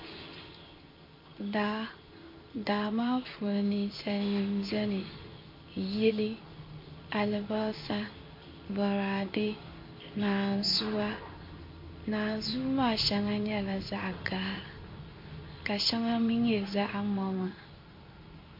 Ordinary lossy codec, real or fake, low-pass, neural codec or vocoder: MP3, 32 kbps; fake; 5.4 kHz; codec, 16 kHz in and 24 kHz out, 1 kbps, XY-Tokenizer